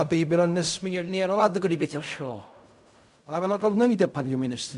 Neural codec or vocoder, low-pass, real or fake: codec, 16 kHz in and 24 kHz out, 0.4 kbps, LongCat-Audio-Codec, fine tuned four codebook decoder; 10.8 kHz; fake